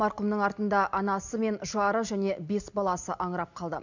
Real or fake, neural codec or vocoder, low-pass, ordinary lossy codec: real; none; 7.2 kHz; none